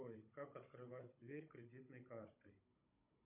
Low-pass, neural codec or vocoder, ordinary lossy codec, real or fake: 3.6 kHz; codec, 16 kHz, 16 kbps, FunCodec, trained on Chinese and English, 50 frames a second; MP3, 32 kbps; fake